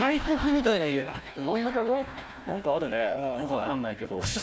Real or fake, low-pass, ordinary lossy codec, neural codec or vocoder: fake; none; none; codec, 16 kHz, 1 kbps, FunCodec, trained on Chinese and English, 50 frames a second